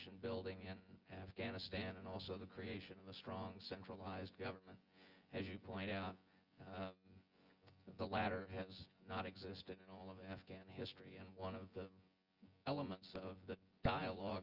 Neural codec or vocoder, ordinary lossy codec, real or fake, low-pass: vocoder, 24 kHz, 100 mel bands, Vocos; Opus, 24 kbps; fake; 5.4 kHz